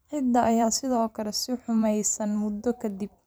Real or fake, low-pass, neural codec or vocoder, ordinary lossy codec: fake; none; vocoder, 44.1 kHz, 128 mel bands every 512 samples, BigVGAN v2; none